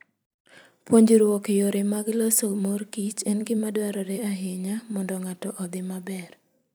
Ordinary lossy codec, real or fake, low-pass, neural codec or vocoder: none; fake; none; vocoder, 44.1 kHz, 128 mel bands every 256 samples, BigVGAN v2